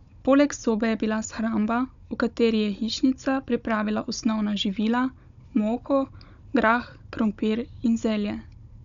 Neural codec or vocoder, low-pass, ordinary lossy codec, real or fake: codec, 16 kHz, 16 kbps, FunCodec, trained on Chinese and English, 50 frames a second; 7.2 kHz; none; fake